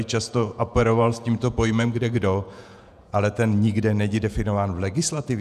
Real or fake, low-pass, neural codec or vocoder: fake; 14.4 kHz; vocoder, 48 kHz, 128 mel bands, Vocos